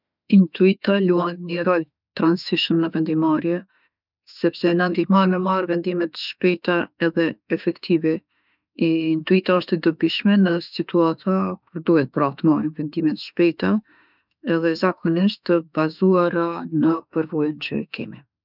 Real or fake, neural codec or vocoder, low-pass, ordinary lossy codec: fake; autoencoder, 48 kHz, 32 numbers a frame, DAC-VAE, trained on Japanese speech; 5.4 kHz; none